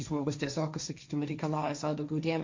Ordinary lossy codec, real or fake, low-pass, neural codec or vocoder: MP3, 48 kbps; fake; 7.2 kHz; codec, 16 kHz, 1.1 kbps, Voila-Tokenizer